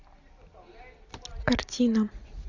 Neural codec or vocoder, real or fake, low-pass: none; real; 7.2 kHz